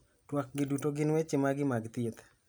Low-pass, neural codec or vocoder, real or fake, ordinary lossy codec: none; none; real; none